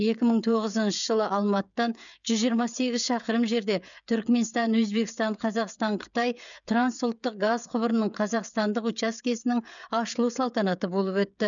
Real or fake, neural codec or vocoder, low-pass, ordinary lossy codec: fake; codec, 16 kHz, 16 kbps, FreqCodec, smaller model; 7.2 kHz; none